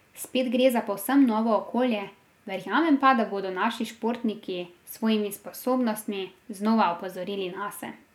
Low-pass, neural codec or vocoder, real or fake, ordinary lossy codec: 19.8 kHz; none; real; none